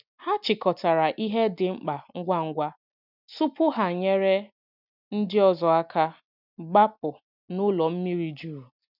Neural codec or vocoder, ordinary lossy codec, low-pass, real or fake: none; none; 5.4 kHz; real